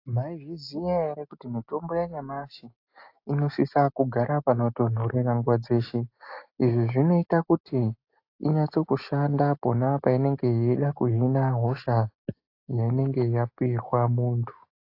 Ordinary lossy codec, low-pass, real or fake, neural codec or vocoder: AAC, 32 kbps; 5.4 kHz; real; none